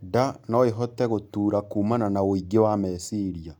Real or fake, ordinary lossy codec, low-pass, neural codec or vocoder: real; none; 19.8 kHz; none